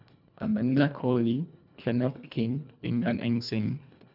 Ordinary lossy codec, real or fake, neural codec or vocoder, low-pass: none; fake; codec, 24 kHz, 1.5 kbps, HILCodec; 5.4 kHz